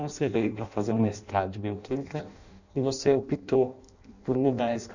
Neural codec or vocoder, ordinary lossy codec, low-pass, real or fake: codec, 16 kHz in and 24 kHz out, 0.6 kbps, FireRedTTS-2 codec; none; 7.2 kHz; fake